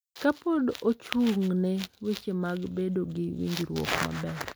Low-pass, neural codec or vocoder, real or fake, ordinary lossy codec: none; none; real; none